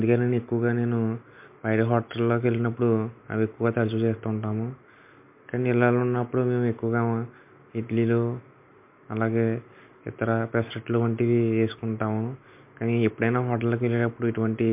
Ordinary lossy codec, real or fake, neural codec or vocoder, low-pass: MP3, 32 kbps; real; none; 3.6 kHz